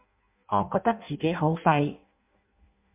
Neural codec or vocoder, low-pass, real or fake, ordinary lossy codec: codec, 16 kHz in and 24 kHz out, 0.6 kbps, FireRedTTS-2 codec; 3.6 kHz; fake; MP3, 32 kbps